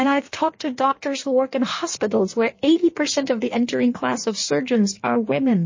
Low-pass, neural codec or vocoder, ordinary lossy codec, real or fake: 7.2 kHz; codec, 16 kHz in and 24 kHz out, 1.1 kbps, FireRedTTS-2 codec; MP3, 32 kbps; fake